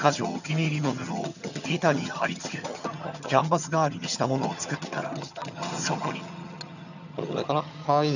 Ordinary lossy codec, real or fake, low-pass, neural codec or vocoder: none; fake; 7.2 kHz; vocoder, 22.05 kHz, 80 mel bands, HiFi-GAN